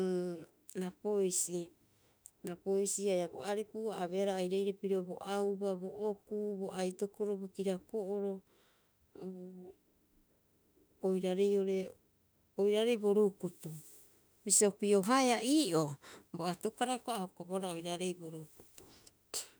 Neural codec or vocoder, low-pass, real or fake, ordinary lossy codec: autoencoder, 48 kHz, 32 numbers a frame, DAC-VAE, trained on Japanese speech; none; fake; none